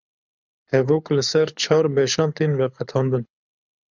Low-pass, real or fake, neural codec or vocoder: 7.2 kHz; fake; codec, 24 kHz, 6 kbps, HILCodec